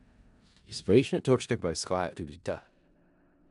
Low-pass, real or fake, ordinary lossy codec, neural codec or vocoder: 10.8 kHz; fake; none; codec, 16 kHz in and 24 kHz out, 0.4 kbps, LongCat-Audio-Codec, four codebook decoder